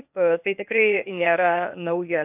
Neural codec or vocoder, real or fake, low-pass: codec, 16 kHz, 0.8 kbps, ZipCodec; fake; 3.6 kHz